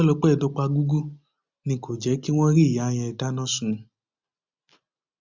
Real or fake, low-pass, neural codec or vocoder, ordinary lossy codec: real; 7.2 kHz; none; Opus, 64 kbps